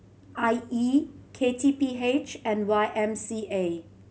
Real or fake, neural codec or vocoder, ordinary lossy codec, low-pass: real; none; none; none